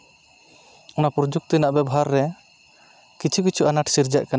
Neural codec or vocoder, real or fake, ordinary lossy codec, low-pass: none; real; none; none